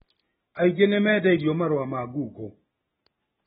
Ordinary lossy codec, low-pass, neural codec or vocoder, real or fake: AAC, 16 kbps; 7.2 kHz; none; real